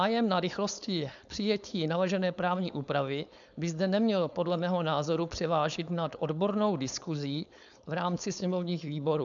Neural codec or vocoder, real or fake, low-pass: codec, 16 kHz, 4.8 kbps, FACodec; fake; 7.2 kHz